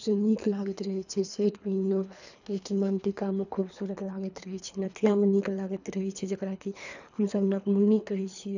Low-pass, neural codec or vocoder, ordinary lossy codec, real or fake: 7.2 kHz; codec, 24 kHz, 3 kbps, HILCodec; none; fake